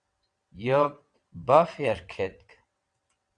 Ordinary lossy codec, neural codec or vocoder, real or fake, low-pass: Opus, 64 kbps; vocoder, 22.05 kHz, 80 mel bands, WaveNeXt; fake; 9.9 kHz